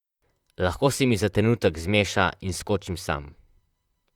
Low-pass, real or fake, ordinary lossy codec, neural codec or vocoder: 19.8 kHz; fake; Opus, 64 kbps; vocoder, 44.1 kHz, 128 mel bands, Pupu-Vocoder